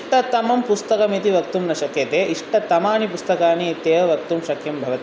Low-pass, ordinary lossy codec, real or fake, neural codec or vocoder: none; none; real; none